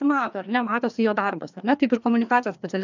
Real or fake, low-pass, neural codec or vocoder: fake; 7.2 kHz; codec, 16 kHz, 2 kbps, FreqCodec, larger model